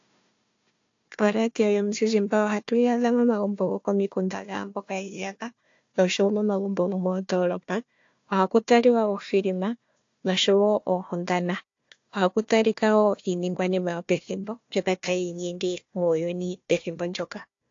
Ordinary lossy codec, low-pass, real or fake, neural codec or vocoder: AAC, 48 kbps; 7.2 kHz; fake; codec, 16 kHz, 1 kbps, FunCodec, trained on Chinese and English, 50 frames a second